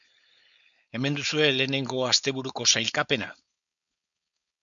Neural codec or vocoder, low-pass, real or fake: codec, 16 kHz, 4.8 kbps, FACodec; 7.2 kHz; fake